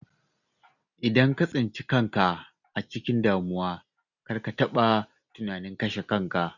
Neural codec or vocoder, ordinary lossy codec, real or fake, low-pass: none; none; real; 7.2 kHz